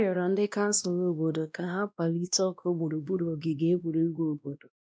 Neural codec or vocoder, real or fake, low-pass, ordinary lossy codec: codec, 16 kHz, 1 kbps, X-Codec, WavLM features, trained on Multilingual LibriSpeech; fake; none; none